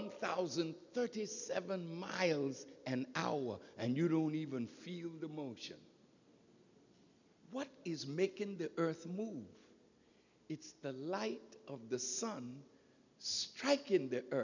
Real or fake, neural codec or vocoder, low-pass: real; none; 7.2 kHz